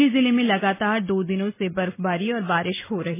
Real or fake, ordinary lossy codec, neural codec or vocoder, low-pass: real; MP3, 16 kbps; none; 3.6 kHz